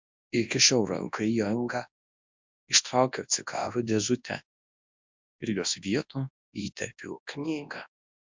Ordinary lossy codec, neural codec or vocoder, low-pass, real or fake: MP3, 64 kbps; codec, 24 kHz, 0.9 kbps, WavTokenizer, large speech release; 7.2 kHz; fake